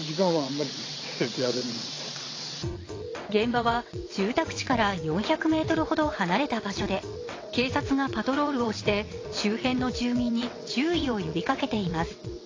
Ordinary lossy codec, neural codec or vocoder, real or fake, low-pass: AAC, 32 kbps; vocoder, 22.05 kHz, 80 mel bands, WaveNeXt; fake; 7.2 kHz